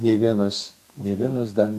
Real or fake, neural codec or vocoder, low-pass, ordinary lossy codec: fake; codec, 32 kHz, 1.9 kbps, SNAC; 14.4 kHz; AAC, 96 kbps